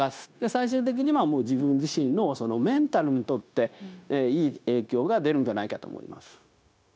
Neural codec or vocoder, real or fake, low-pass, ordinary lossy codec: codec, 16 kHz, 0.9 kbps, LongCat-Audio-Codec; fake; none; none